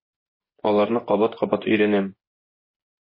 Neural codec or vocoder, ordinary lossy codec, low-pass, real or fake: vocoder, 24 kHz, 100 mel bands, Vocos; MP3, 24 kbps; 5.4 kHz; fake